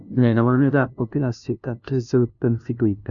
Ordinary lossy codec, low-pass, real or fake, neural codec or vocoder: none; 7.2 kHz; fake; codec, 16 kHz, 0.5 kbps, FunCodec, trained on LibriTTS, 25 frames a second